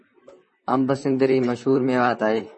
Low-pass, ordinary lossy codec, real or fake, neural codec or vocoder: 10.8 kHz; MP3, 32 kbps; fake; vocoder, 44.1 kHz, 128 mel bands, Pupu-Vocoder